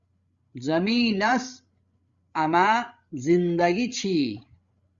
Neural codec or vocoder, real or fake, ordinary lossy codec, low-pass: codec, 16 kHz, 16 kbps, FreqCodec, larger model; fake; Opus, 64 kbps; 7.2 kHz